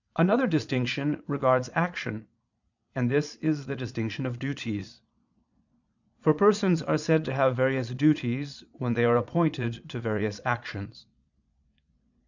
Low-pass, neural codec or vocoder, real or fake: 7.2 kHz; vocoder, 22.05 kHz, 80 mel bands, Vocos; fake